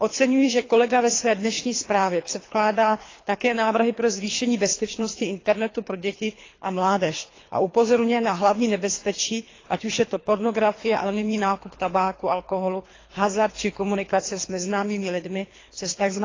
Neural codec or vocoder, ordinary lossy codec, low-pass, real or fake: codec, 24 kHz, 3 kbps, HILCodec; AAC, 32 kbps; 7.2 kHz; fake